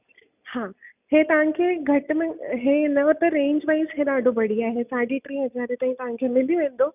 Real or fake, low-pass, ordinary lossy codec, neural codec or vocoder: real; 3.6 kHz; none; none